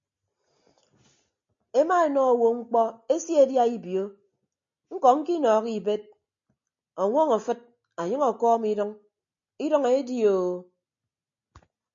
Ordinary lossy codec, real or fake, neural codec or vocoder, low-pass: MP3, 48 kbps; real; none; 7.2 kHz